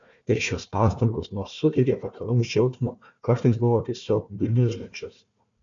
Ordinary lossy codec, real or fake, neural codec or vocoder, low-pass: MP3, 64 kbps; fake; codec, 16 kHz, 1 kbps, FunCodec, trained on Chinese and English, 50 frames a second; 7.2 kHz